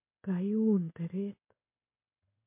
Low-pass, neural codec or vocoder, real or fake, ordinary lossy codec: 3.6 kHz; codec, 16 kHz in and 24 kHz out, 1 kbps, XY-Tokenizer; fake; MP3, 24 kbps